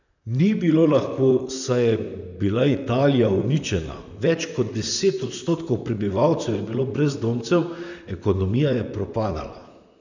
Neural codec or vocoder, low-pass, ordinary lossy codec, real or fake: vocoder, 44.1 kHz, 128 mel bands, Pupu-Vocoder; 7.2 kHz; none; fake